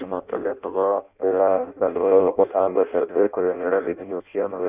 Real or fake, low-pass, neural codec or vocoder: fake; 3.6 kHz; codec, 16 kHz in and 24 kHz out, 0.6 kbps, FireRedTTS-2 codec